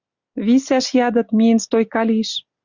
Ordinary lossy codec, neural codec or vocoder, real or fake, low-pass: Opus, 64 kbps; none; real; 7.2 kHz